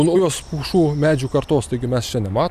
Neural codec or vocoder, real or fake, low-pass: none; real; 14.4 kHz